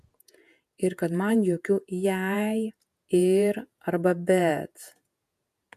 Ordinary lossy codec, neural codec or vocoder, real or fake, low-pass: MP3, 96 kbps; vocoder, 48 kHz, 128 mel bands, Vocos; fake; 14.4 kHz